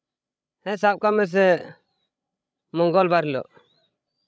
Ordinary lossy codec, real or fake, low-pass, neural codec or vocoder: none; fake; none; codec, 16 kHz, 16 kbps, FreqCodec, larger model